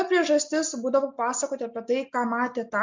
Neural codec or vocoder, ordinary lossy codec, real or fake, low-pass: none; MP3, 64 kbps; real; 7.2 kHz